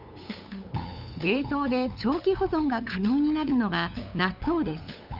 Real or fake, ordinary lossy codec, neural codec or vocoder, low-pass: fake; none; codec, 16 kHz, 8 kbps, FunCodec, trained on LibriTTS, 25 frames a second; 5.4 kHz